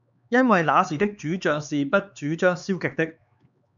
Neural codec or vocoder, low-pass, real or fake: codec, 16 kHz, 4 kbps, X-Codec, HuBERT features, trained on LibriSpeech; 7.2 kHz; fake